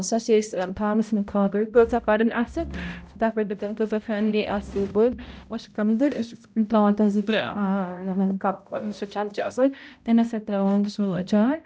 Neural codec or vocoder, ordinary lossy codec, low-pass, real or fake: codec, 16 kHz, 0.5 kbps, X-Codec, HuBERT features, trained on balanced general audio; none; none; fake